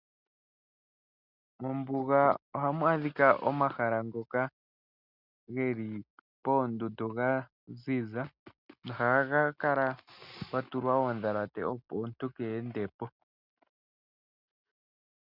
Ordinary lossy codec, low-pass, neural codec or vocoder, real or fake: Opus, 64 kbps; 5.4 kHz; none; real